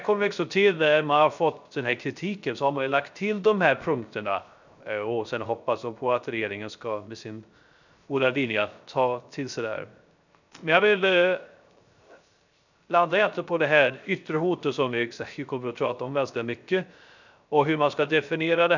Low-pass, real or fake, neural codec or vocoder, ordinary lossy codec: 7.2 kHz; fake; codec, 16 kHz, 0.3 kbps, FocalCodec; none